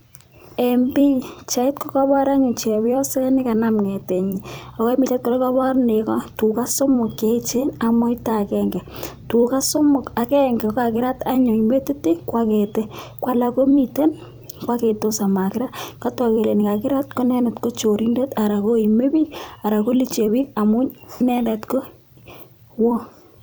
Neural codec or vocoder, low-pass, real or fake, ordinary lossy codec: vocoder, 44.1 kHz, 128 mel bands every 256 samples, BigVGAN v2; none; fake; none